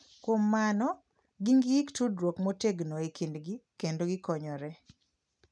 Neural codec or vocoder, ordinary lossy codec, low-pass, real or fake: none; none; 9.9 kHz; real